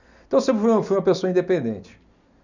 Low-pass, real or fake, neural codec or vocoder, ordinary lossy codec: 7.2 kHz; real; none; none